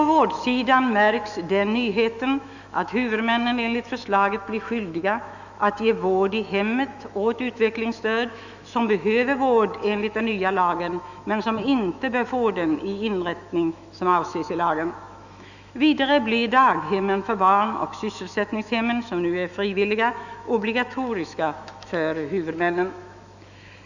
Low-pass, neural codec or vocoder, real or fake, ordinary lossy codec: 7.2 kHz; autoencoder, 48 kHz, 128 numbers a frame, DAC-VAE, trained on Japanese speech; fake; none